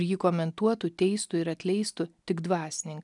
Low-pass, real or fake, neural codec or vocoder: 10.8 kHz; real; none